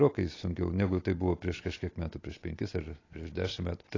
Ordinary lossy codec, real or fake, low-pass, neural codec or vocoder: AAC, 32 kbps; real; 7.2 kHz; none